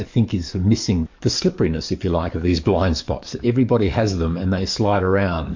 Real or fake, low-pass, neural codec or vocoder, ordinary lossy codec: fake; 7.2 kHz; codec, 44.1 kHz, 7.8 kbps, DAC; MP3, 48 kbps